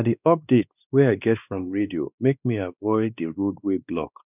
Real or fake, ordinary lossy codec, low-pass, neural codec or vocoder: fake; none; 3.6 kHz; codec, 16 kHz, 2 kbps, X-Codec, WavLM features, trained on Multilingual LibriSpeech